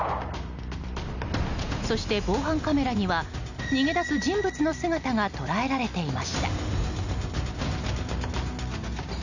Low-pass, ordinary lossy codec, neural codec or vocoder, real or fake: 7.2 kHz; none; none; real